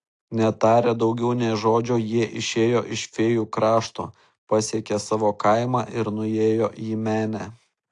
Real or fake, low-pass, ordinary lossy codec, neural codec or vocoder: real; 10.8 kHz; AAC, 48 kbps; none